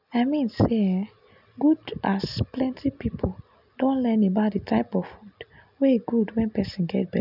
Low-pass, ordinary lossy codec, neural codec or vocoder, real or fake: 5.4 kHz; none; none; real